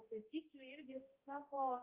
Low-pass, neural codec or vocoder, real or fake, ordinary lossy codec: 3.6 kHz; codec, 16 kHz, 0.5 kbps, X-Codec, HuBERT features, trained on balanced general audio; fake; Opus, 32 kbps